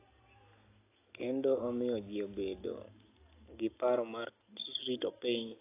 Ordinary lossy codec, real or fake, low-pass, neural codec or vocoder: AAC, 24 kbps; real; 3.6 kHz; none